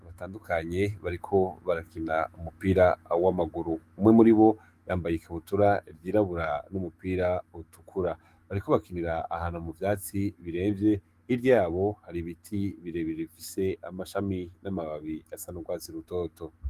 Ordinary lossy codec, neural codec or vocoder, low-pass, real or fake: Opus, 24 kbps; autoencoder, 48 kHz, 128 numbers a frame, DAC-VAE, trained on Japanese speech; 14.4 kHz; fake